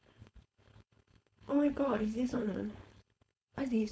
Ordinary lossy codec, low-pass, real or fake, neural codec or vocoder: none; none; fake; codec, 16 kHz, 4.8 kbps, FACodec